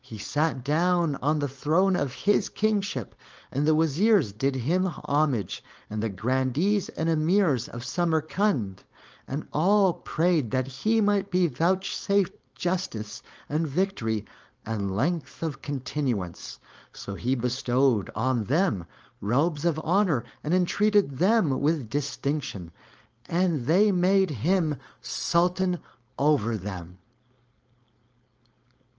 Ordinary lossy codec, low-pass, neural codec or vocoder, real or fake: Opus, 24 kbps; 7.2 kHz; codec, 16 kHz, 4.8 kbps, FACodec; fake